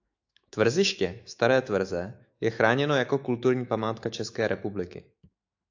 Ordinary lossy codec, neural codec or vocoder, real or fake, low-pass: AAC, 48 kbps; autoencoder, 48 kHz, 128 numbers a frame, DAC-VAE, trained on Japanese speech; fake; 7.2 kHz